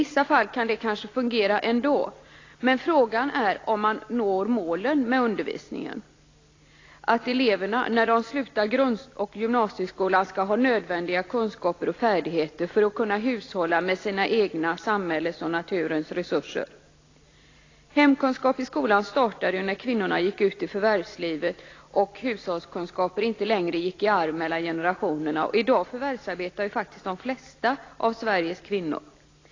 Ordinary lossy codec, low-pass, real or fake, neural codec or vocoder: AAC, 32 kbps; 7.2 kHz; real; none